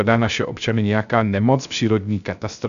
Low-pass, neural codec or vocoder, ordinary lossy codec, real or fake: 7.2 kHz; codec, 16 kHz, 0.3 kbps, FocalCodec; MP3, 96 kbps; fake